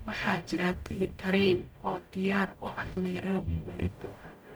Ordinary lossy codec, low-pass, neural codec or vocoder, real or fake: none; none; codec, 44.1 kHz, 0.9 kbps, DAC; fake